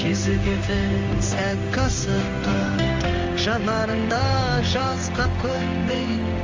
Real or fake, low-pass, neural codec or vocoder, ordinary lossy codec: fake; 7.2 kHz; codec, 16 kHz in and 24 kHz out, 1 kbps, XY-Tokenizer; Opus, 32 kbps